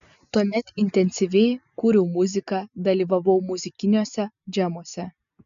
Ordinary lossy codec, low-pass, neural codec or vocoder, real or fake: AAC, 96 kbps; 7.2 kHz; none; real